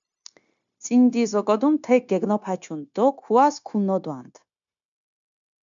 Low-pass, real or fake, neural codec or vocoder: 7.2 kHz; fake; codec, 16 kHz, 0.9 kbps, LongCat-Audio-Codec